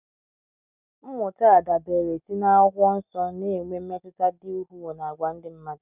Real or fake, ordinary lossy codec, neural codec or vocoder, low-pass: real; none; none; 3.6 kHz